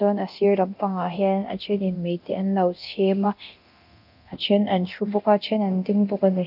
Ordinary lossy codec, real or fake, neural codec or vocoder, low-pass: none; fake; codec, 24 kHz, 0.9 kbps, DualCodec; 5.4 kHz